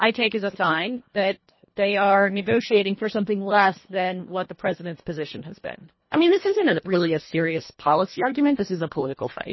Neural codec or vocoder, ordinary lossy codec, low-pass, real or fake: codec, 24 kHz, 1.5 kbps, HILCodec; MP3, 24 kbps; 7.2 kHz; fake